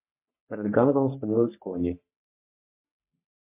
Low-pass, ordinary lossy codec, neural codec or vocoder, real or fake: 3.6 kHz; MP3, 24 kbps; codec, 44.1 kHz, 3.4 kbps, Pupu-Codec; fake